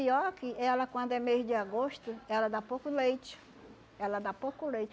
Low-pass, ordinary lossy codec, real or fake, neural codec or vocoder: none; none; real; none